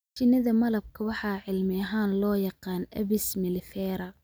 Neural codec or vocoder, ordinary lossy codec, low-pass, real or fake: none; none; none; real